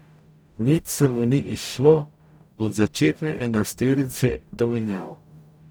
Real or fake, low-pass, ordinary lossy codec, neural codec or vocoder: fake; none; none; codec, 44.1 kHz, 0.9 kbps, DAC